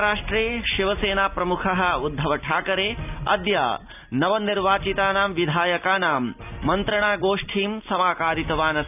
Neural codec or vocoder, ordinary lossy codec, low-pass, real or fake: none; none; 3.6 kHz; real